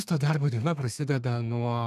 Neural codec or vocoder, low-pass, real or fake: codec, 32 kHz, 1.9 kbps, SNAC; 14.4 kHz; fake